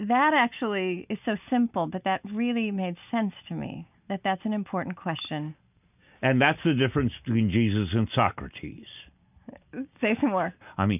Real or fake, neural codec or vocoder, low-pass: real; none; 3.6 kHz